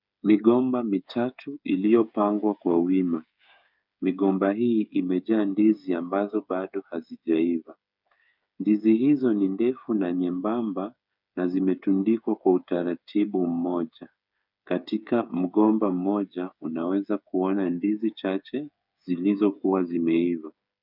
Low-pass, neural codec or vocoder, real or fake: 5.4 kHz; codec, 16 kHz, 8 kbps, FreqCodec, smaller model; fake